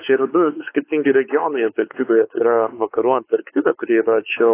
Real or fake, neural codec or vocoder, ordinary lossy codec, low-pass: fake; codec, 16 kHz, 4 kbps, X-Codec, WavLM features, trained on Multilingual LibriSpeech; AAC, 24 kbps; 3.6 kHz